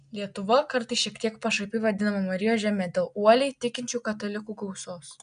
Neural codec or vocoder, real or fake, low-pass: none; real; 9.9 kHz